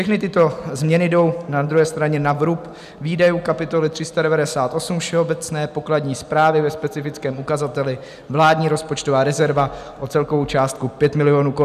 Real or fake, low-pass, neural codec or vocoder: real; 14.4 kHz; none